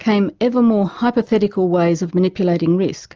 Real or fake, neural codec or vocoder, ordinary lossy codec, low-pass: real; none; Opus, 24 kbps; 7.2 kHz